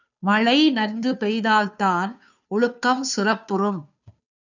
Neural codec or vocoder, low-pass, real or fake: codec, 16 kHz, 2 kbps, FunCodec, trained on Chinese and English, 25 frames a second; 7.2 kHz; fake